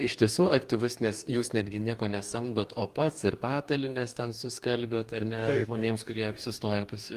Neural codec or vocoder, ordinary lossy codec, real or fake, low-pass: codec, 44.1 kHz, 2.6 kbps, DAC; Opus, 32 kbps; fake; 14.4 kHz